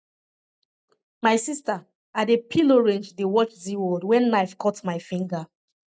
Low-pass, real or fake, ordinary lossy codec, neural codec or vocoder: none; real; none; none